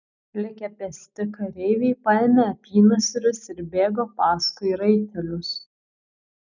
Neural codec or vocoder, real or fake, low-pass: none; real; 7.2 kHz